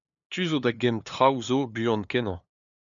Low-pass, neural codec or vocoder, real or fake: 7.2 kHz; codec, 16 kHz, 2 kbps, FunCodec, trained on LibriTTS, 25 frames a second; fake